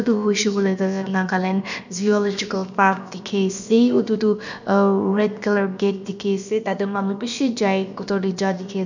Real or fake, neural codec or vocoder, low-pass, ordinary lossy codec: fake; codec, 16 kHz, about 1 kbps, DyCAST, with the encoder's durations; 7.2 kHz; none